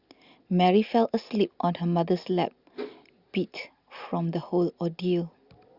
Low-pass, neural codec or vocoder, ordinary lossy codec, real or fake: 5.4 kHz; none; Opus, 64 kbps; real